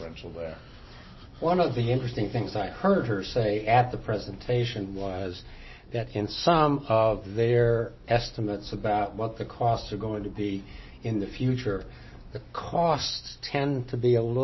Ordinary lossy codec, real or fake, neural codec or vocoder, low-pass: MP3, 24 kbps; real; none; 7.2 kHz